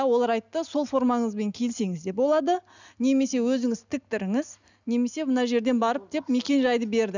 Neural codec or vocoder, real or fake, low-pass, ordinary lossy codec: none; real; 7.2 kHz; none